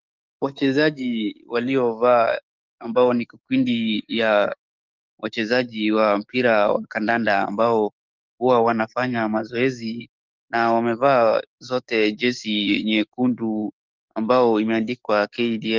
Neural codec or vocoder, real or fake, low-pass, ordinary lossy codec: codec, 44.1 kHz, 7.8 kbps, DAC; fake; 7.2 kHz; Opus, 24 kbps